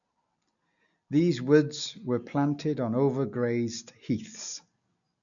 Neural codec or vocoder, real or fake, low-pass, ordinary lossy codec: none; real; 7.2 kHz; none